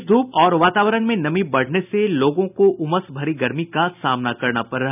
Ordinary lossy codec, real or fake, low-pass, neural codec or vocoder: none; real; 3.6 kHz; none